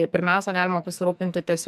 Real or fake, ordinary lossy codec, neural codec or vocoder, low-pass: fake; MP3, 96 kbps; codec, 44.1 kHz, 2.6 kbps, SNAC; 14.4 kHz